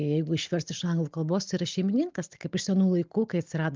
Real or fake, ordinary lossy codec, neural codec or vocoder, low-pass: real; Opus, 32 kbps; none; 7.2 kHz